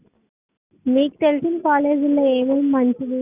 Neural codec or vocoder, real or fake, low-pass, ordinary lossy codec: none; real; 3.6 kHz; none